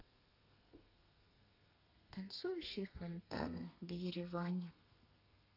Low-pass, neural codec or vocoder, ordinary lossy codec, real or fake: 5.4 kHz; codec, 32 kHz, 1.9 kbps, SNAC; none; fake